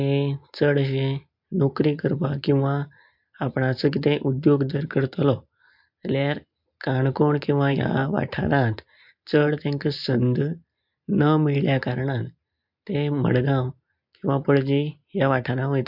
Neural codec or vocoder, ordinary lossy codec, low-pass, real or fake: none; MP3, 48 kbps; 5.4 kHz; real